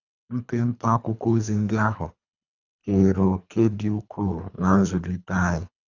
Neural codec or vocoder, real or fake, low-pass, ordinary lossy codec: codec, 24 kHz, 3 kbps, HILCodec; fake; 7.2 kHz; none